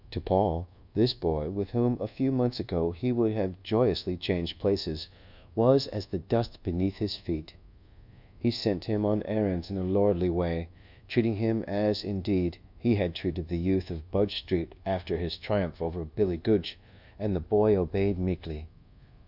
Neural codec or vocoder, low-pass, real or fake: codec, 24 kHz, 1.2 kbps, DualCodec; 5.4 kHz; fake